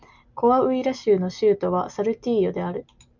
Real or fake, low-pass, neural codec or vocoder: real; 7.2 kHz; none